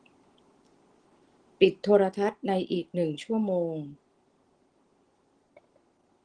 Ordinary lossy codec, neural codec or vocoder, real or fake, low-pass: Opus, 16 kbps; none; real; 9.9 kHz